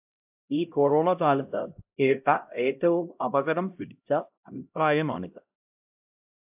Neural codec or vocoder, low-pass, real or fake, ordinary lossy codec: codec, 16 kHz, 0.5 kbps, X-Codec, HuBERT features, trained on LibriSpeech; 3.6 kHz; fake; AAC, 32 kbps